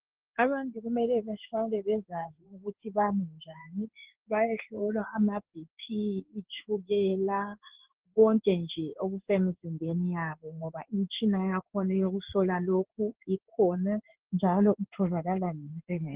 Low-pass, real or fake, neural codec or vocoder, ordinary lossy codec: 3.6 kHz; fake; codec, 16 kHz in and 24 kHz out, 1 kbps, XY-Tokenizer; Opus, 16 kbps